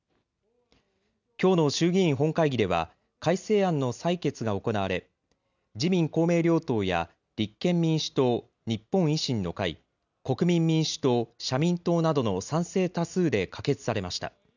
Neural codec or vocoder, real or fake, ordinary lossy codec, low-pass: none; real; none; 7.2 kHz